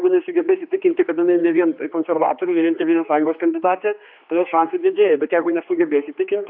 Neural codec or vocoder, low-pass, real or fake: codec, 16 kHz, 2 kbps, X-Codec, HuBERT features, trained on general audio; 5.4 kHz; fake